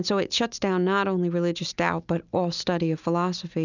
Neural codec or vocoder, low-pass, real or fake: none; 7.2 kHz; real